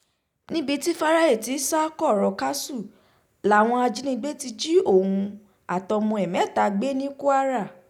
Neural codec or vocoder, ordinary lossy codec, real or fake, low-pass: none; none; real; 19.8 kHz